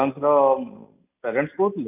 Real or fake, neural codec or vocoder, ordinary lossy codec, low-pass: real; none; none; 3.6 kHz